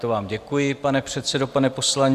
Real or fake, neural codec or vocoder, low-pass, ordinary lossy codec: real; none; 14.4 kHz; Opus, 64 kbps